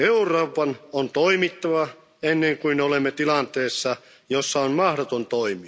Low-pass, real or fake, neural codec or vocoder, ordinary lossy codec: none; real; none; none